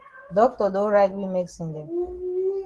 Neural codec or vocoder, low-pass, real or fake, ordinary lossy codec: none; 10.8 kHz; real; Opus, 16 kbps